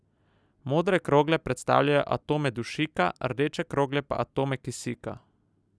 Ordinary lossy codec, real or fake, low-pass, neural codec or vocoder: none; real; none; none